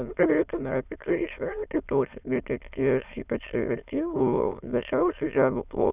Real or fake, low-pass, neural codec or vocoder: fake; 3.6 kHz; autoencoder, 22.05 kHz, a latent of 192 numbers a frame, VITS, trained on many speakers